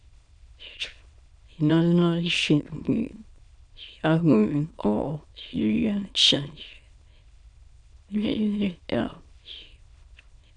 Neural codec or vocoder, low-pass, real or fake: autoencoder, 22.05 kHz, a latent of 192 numbers a frame, VITS, trained on many speakers; 9.9 kHz; fake